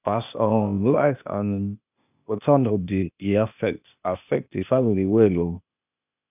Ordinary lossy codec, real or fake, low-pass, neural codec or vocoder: none; fake; 3.6 kHz; codec, 16 kHz, 0.8 kbps, ZipCodec